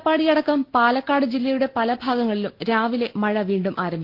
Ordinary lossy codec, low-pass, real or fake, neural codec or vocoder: Opus, 16 kbps; 5.4 kHz; real; none